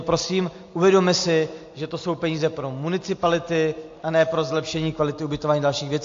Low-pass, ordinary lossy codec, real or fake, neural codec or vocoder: 7.2 kHz; AAC, 48 kbps; real; none